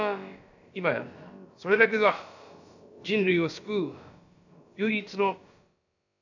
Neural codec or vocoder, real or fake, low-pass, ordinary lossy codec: codec, 16 kHz, about 1 kbps, DyCAST, with the encoder's durations; fake; 7.2 kHz; none